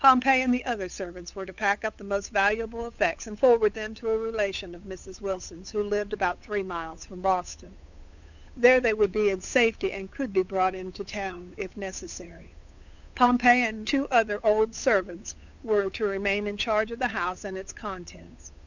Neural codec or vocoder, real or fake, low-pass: codec, 16 kHz, 8 kbps, FunCodec, trained on Chinese and English, 25 frames a second; fake; 7.2 kHz